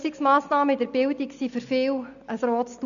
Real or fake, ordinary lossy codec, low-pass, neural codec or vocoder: real; none; 7.2 kHz; none